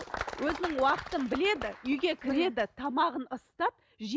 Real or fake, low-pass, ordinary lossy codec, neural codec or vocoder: real; none; none; none